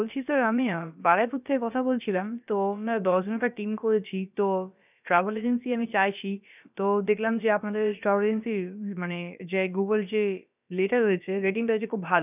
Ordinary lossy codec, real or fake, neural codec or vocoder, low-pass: none; fake; codec, 16 kHz, about 1 kbps, DyCAST, with the encoder's durations; 3.6 kHz